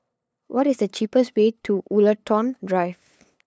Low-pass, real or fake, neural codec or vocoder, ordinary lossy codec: none; fake; codec, 16 kHz, 8 kbps, FunCodec, trained on LibriTTS, 25 frames a second; none